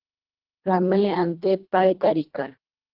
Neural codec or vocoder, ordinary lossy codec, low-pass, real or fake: codec, 24 kHz, 1.5 kbps, HILCodec; Opus, 16 kbps; 5.4 kHz; fake